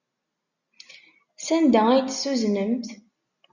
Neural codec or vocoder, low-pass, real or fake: none; 7.2 kHz; real